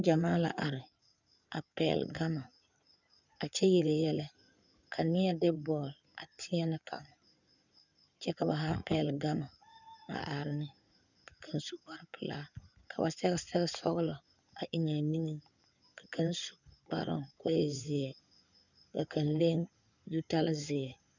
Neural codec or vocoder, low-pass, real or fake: codec, 16 kHz in and 24 kHz out, 2.2 kbps, FireRedTTS-2 codec; 7.2 kHz; fake